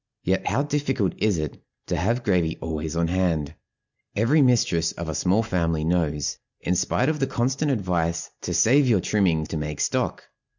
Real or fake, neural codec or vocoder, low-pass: real; none; 7.2 kHz